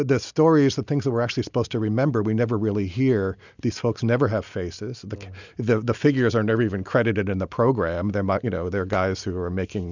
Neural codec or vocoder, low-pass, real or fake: none; 7.2 kHz; real